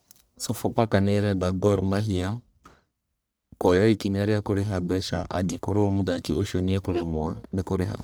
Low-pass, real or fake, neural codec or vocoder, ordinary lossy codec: none; fake; codec, 44.1 kHz, 1.7 kbps, Pupu-Codec; none